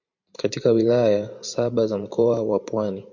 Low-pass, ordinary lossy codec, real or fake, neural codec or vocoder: 7.2 kHz; MP3, 48 kbps; fake; vocoder, 44.1 kHz, 128 mel bands every 512 samples, BigVGAN v2